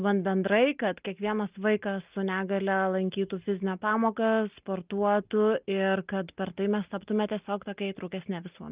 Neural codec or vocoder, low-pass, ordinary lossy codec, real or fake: none; 3.6 kHz; Opus, 32 kbps; real